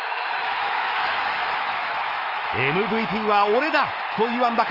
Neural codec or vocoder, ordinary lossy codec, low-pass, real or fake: autoencoder, 48 kHz, 128 numbers a frame, DAC-VAE, trained on Japanese speech; Opus, 24 kbps; 5.4 kHz; fake